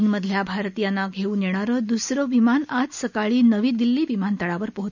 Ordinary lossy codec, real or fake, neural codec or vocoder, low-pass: none; real; none; 7.2 kHz